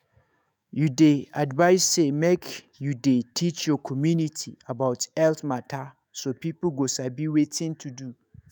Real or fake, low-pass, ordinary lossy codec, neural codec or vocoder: fake; none; none; autoencoder, 48 kHz, 128 numbers a frame, DAC-VAE, trained on Japanese speech